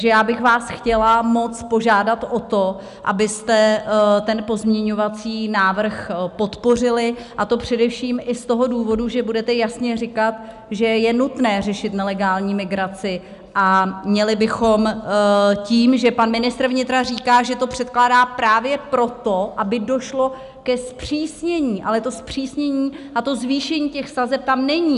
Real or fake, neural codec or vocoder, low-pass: real; none; 10.8 kHz